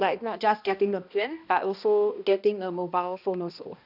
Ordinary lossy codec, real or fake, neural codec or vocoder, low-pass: none; fake; codec, 16 kHz, 1 kbps, X-Codec, HuBERT features, trained on balanced general audio; 5.4 kHz